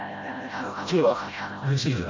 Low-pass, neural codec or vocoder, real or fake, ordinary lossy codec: 7.2 kHz; codec, 16 kHz, 0.5 kbps, FreqCodec, smaller model; fake; MP3, 48 kbps